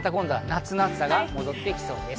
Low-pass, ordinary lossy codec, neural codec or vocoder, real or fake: none; none; none; real